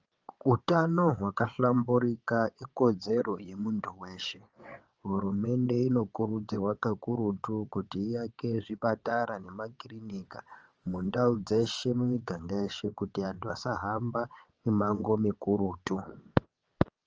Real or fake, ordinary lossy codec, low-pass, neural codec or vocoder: fake; Opus, 24 kbps; 7.2 kHz; vocoder, 22.05 kHz, 80 mel bands, WaveNeXt